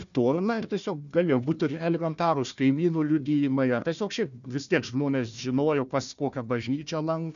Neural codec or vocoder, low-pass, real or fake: codec, 16 kHz, 1 kbps, FunCodec, trained on Chinese and English, 50 frames a second; 7.2 kHz; fake